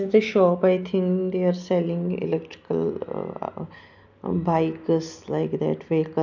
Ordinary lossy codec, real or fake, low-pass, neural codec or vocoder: none; real; 7.2 kHz; none